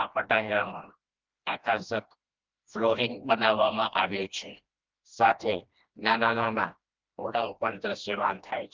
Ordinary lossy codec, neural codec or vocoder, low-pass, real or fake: Opus, 24 kbps; codec, 16 kHz, 1 kbps, FreqCodec, smaller model; 7.2 kHz; fake